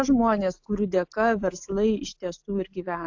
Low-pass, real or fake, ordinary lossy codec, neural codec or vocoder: 7.2 kHz; real; AAC, 48 kbps; none